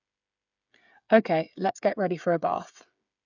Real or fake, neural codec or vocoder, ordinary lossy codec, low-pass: fake; codec, 16 kHz, 8 kbps, FreqCodec, smaller model; none; 7.2 kHz